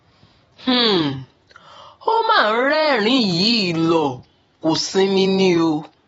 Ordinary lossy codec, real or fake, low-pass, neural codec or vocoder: AAC, 24 kbps; fake; 19.8 kHz; vocoder, 48 kHz, 128 mel bands, Vocos